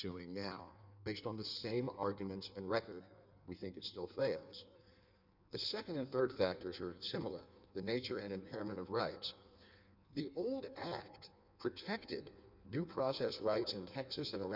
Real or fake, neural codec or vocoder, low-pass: fake; codec, 16 kHz in and 24 kHz out, 1.1 kbps, FireRedTTS-2 codec; 5.4 kHz